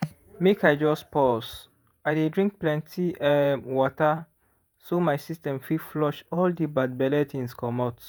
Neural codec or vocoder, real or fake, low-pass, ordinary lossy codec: vocoder, 48 kHz, 128 mel bands, Vocos; fake; none; none